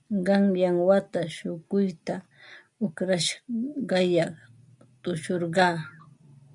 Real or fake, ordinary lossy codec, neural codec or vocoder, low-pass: real; AAC, 64 kbps; none; 10.8 kHz